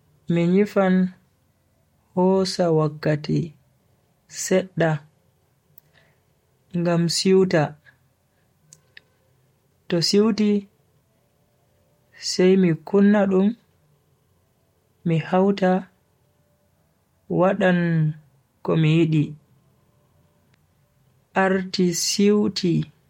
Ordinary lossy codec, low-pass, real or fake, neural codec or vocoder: MP3, 64 kbps; 19.8 kHz; real; none